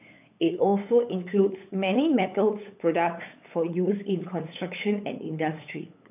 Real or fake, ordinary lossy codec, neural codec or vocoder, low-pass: fake; AAC, 32 kbps; codec, 16 kHz, 8 kbps, FunCodec, trained on LibriTTS, 25 frames a second; 3.6 kHz